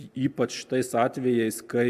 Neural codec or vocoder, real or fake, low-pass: none; real; 14.4 kHz